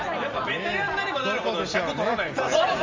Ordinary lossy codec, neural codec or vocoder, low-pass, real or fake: Opus, 32 kbps; none; 7.2 kHz; real